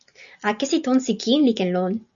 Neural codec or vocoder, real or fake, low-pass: none; real; 7.2 kHz